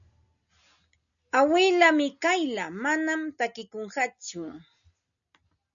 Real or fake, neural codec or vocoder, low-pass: real; none; 7.2 kHz